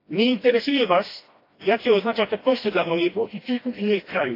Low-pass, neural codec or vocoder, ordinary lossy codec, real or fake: 5.4 kHz; codec, 16 kHz, 1 kbps, FreqCodec, smaller model; AAC, 32 kbps; fake